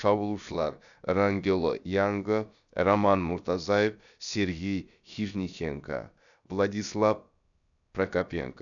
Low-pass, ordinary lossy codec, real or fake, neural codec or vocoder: 7.2 kHz; none; fake; codec, 16 kHz, about 1 kbps, DyCAST, with the encoder's durations